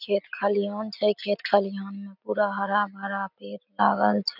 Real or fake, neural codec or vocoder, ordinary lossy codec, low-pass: real; none; none; 5.4 kHz